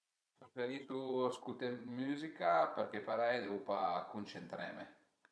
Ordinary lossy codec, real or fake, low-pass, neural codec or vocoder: none; fake; none; vocoder, 22.05 kHz, 80 mel bands, Vocos